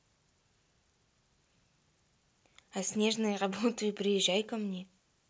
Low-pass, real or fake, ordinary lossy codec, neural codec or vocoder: none; real; none; none